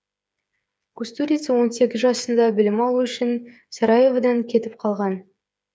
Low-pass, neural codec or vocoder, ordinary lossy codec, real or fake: none; codec, 16 kHz, 8 kbps, FreqCodec, smaller model; none; fake